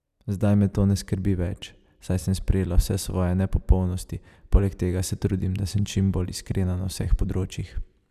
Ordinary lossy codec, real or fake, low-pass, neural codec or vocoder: none; real; 14.4 kHz; none